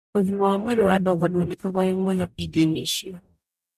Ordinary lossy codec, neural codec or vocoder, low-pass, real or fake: none; codec, 44.1 kHz, 0.9 kbps, DAC; 14.4 kHz; fake